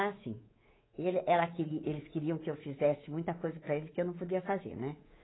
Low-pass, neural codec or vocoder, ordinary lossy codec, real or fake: 7.2 kHz; codec, 24 kHz, 3.1 kbps, DualCodec; AAC, 16 kbps; fake